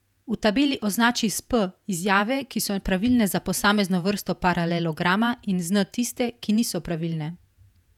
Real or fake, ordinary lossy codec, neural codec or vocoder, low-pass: fake; none; vocoder, 48 kHz, 128 mel bands, Vocos; 19.8 kHz